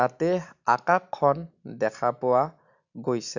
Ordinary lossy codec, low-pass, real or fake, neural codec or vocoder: none; 7.2 kHz; real; none